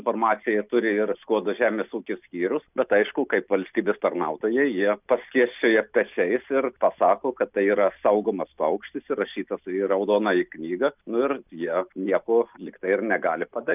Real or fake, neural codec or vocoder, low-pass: real; none; 3.6 kHz